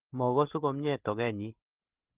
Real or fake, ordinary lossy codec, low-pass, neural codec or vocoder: fake; Opus, 16 kbps; 3.6 kHz; codec, 16 kHz, 8 kbps, FreqCodec, larger model